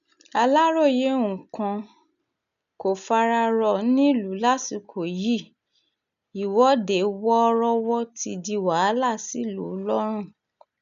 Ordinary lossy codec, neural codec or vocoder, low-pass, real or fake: none; none; 7.2 kHz; real